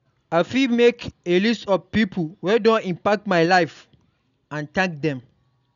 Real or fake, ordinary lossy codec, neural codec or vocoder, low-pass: real; none; none; 7.2 kHz